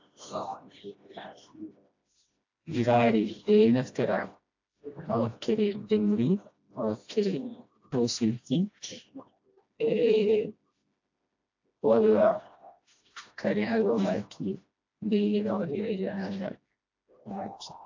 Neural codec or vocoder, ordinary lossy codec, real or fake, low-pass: codec, 16 kHz, 1 kbps, FreqCodec, smaller model; AAC, 48 kbps; fake; 7.2 kHz